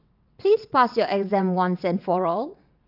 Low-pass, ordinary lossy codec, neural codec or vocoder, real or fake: 5.4 kHz; none; vocoder, 22.05 kHz, 80 mel bands, WaveNeXt; fake